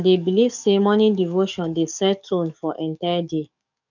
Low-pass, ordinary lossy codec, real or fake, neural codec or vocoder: 7.2 kHz; none; fake; codec, 44.1 kHz, 7.8 kbps, DAC